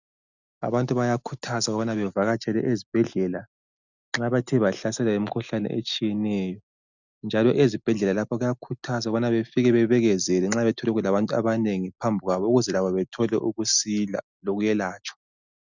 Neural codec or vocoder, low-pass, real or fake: none; 7.2 kHz; real